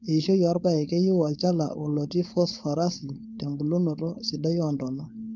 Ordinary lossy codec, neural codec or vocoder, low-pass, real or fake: none; codec, 16 kHz, 4.8 kbps, FACodec; 7.2 kHz; fake